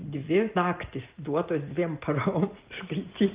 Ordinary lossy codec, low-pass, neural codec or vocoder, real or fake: Opus, 24 kbps; 3.6 kHz; none; real